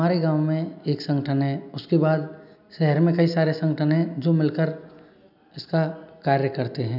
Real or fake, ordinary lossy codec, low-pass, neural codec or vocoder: real; none; 5.4 kHz; none